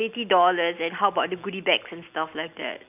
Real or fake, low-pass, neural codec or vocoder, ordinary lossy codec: real; 3.6 kHz; none; none